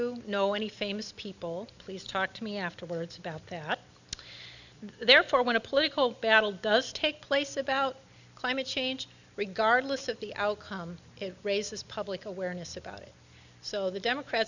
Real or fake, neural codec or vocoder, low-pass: real; none; 7.2 kHz